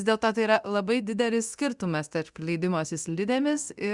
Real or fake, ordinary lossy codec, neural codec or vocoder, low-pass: fake; Opus, 64 kbps; codec, 24 kHz, 0.9 kbps, DualCodec; 10.8 kHz